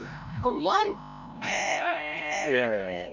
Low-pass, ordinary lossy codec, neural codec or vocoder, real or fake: 7.2 kHz; none; codec, 16 kHz, 0.5 kbps, FreqCodec, larger model; fake